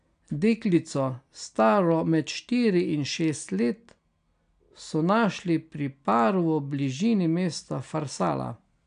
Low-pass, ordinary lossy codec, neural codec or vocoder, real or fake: 9.9 kHz; none; none; real